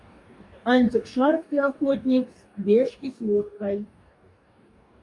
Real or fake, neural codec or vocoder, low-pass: fake; codec, 44.1 kHz, 2.6 kbps, DAC; 10.8 kHz